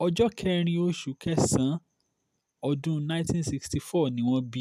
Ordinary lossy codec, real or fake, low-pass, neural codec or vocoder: none; real; 14.4 kHz; none